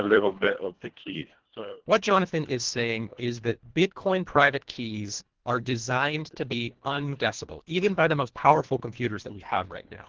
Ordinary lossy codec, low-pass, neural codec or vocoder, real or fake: Opus, 16 kbps; 7.2 kHz; codec, 24 kHz, 1.5 kbps, HILCodec; fake